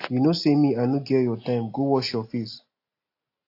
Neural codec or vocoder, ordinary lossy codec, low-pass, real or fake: none; AAC, 32 kbps; 5.4 kHz; real